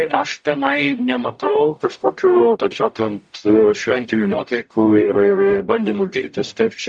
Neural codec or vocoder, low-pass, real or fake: codec, 44.1 kHz, 0.9 kbps, DAC; 9.9 kHz; fake